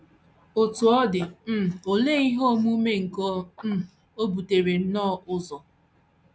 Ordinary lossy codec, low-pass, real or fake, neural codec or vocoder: none; none; real; none